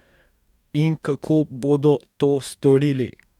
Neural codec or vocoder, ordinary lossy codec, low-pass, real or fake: codec, 44.1 kHz, 2.6 kbps, DAC; none; 19.8 kHz; fake